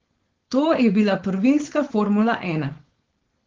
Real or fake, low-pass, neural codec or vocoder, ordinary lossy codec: fake; 7.2 kHz; codec, 16 kHz, 4.8 kbps, FACodec; Opus, 16 kbps